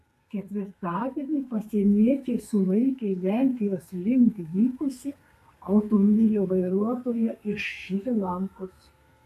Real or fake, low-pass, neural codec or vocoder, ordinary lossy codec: fake; 14.4 kHz; codec, 32 kHz, 1.9 kbps, SNAC; MP3, 96 kbps